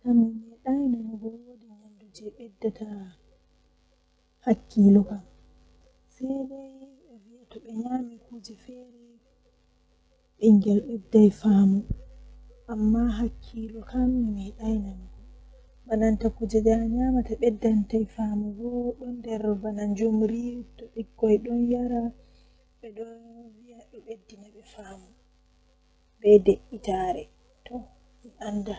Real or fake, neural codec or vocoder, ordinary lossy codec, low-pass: real; none; none; none